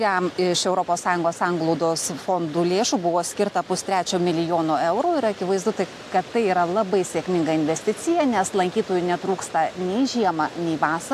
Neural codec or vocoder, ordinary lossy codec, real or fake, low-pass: none; AAC, 64 kbps; real; 14.4 kHz